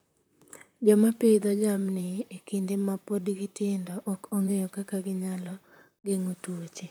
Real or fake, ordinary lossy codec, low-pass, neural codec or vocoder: fake; none; none; vocoder, 44.1 kHz, 128 mel bands, Pupu-Vocoder